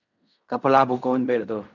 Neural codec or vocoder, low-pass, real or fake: codec, 16 kHz in and 24 kHz out, 0.4 kbps, LongCat-Audio-Codec, fine tuned four codebook decoder; 7.2 kHz; fake